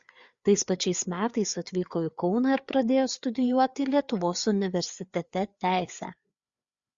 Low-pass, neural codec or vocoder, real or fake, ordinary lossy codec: 7.2 kHz; codec, 16 kHz, 4 kbps, FreqCodec, larger model; fake; Opus, 64 kbps